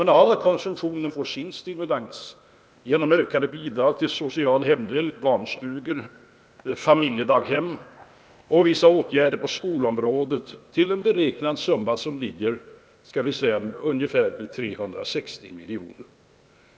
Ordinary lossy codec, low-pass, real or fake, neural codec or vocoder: none; none; fake; codec, 16 kHz, 0.8 kbps, ZipCodec